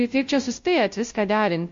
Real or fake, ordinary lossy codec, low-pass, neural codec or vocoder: fake; MP3, 48 kbps; 7.2 kHz; codec, 16 kHz, 0.5 kbps, FunCodec, trained on Chinese and English, 25 frames a second